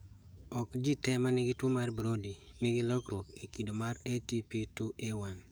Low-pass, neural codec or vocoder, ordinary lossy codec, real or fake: none; codec, 44.1 kHz, 7.8 kbps, DAC; none; fake